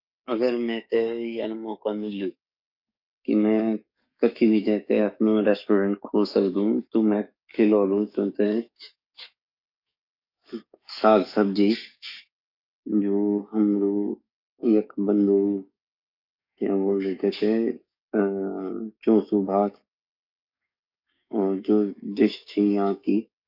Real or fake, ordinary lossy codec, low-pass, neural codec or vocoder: fake; none; 5.4 kHz; codec, 44.1 kHz, 7.8 kbps, DAC